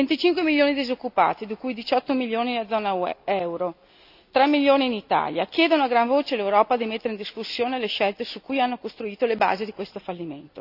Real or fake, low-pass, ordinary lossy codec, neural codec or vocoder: real; 5.4 kHz; none; none